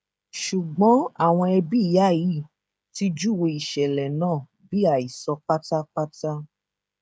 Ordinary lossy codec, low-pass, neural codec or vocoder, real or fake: none; none; codec, 16 kHz, 16 kbps, FreqCodec, smaller model; fake